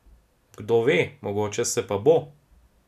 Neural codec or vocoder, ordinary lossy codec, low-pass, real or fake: none; none; 14.4 kHz; real